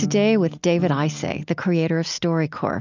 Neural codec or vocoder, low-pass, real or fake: none; 7.2 kHz; real